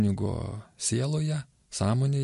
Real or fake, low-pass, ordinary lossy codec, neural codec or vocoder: real; 14.4 kHz; MP3, 48 kbps; none